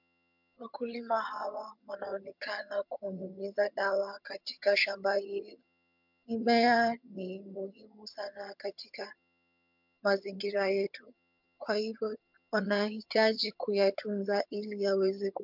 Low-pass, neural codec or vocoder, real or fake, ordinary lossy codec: 5.4 kHz; vocoder, 22.05 kHz, 80 mel bands, HiFi-GAN; fake; MP3, 48 kbps